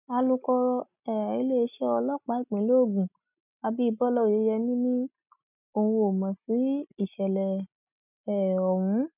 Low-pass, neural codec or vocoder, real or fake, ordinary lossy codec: 3.6 kHz; none; real; none